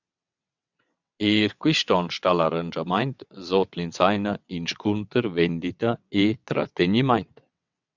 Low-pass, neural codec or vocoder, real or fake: 7.2 kHz; vocoder, 22.05 kHz, 80 mel bands, WaveNeXt; fake